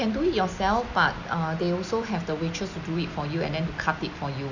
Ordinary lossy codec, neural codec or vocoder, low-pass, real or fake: none; none; 7.2 kHz; real